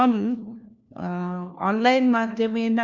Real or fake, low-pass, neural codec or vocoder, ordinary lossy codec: fake; 7.2 kHz; codec, 16 kHz, 1 kbps, FunCodec, trained on LibriTTS, 50 frames a second; none